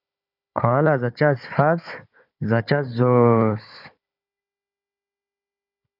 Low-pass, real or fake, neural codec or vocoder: 5.4 kHz; fake; codec, 16 kHz, 4 kbps, FunCodec, trained on Chinese and English, 50 frames a second